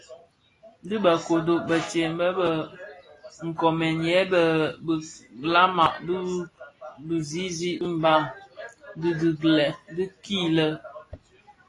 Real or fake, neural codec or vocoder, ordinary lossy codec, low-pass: real; none; AAC, 32 kbps; 10.8 kHz